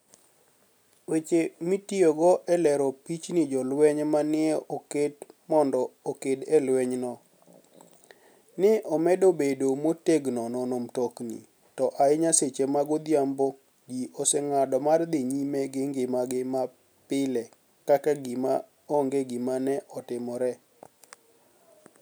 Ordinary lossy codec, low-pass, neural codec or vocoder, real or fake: none; none; none; real